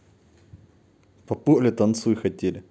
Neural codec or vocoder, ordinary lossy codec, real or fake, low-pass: none; none; real; none